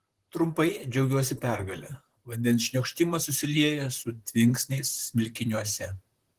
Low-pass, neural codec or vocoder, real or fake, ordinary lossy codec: 14.4 kHz; vocoder, 44.1 kHz, 128 mel bands, Pupu-Vocoder; fake; Opus, 16 kbps